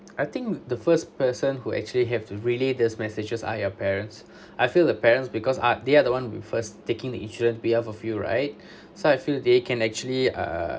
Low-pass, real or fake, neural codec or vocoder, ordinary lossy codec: none; real; none; none